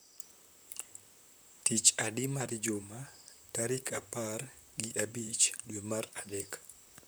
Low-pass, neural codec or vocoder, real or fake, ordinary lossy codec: none; vocoder, 44.1 kHz, 128 mel bands, Pupu-Vocoder; fake; none